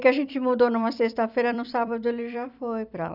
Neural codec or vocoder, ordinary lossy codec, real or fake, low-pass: vocoder, 44.1 kHz, 128 mel bands every 256 samples, BigVGAN v2; none; fake; 5.4 kHz